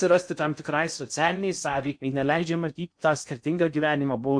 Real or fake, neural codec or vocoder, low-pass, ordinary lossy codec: fake; codec, 16 kHz in and 24 kHz out, 0.6 kbps, FocalCodec, streaming, 2048 codes; 9.9 kHz; MP3, 64 kbps